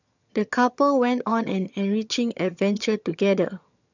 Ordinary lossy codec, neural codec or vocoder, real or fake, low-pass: none; vocoder, 22.05 kHz, 80 mel bands, HiFi-GAN; fake; 7.2 kHz